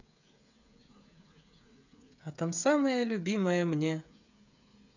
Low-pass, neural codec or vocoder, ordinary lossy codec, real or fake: 7.2 kHz; codec, 16 kHz, 8 kbps, FreqCodec, smaller model; none; fake